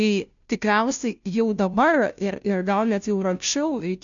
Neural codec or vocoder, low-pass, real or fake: codec, 16 kHz, 0.5 kbps, FunCodec, trained on Chinese and English, 25 frames a second; 7.2 kHz; fake